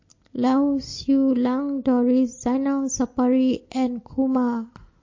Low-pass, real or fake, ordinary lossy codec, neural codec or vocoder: 7.2 kHz; real; MP3, 32 kbps; none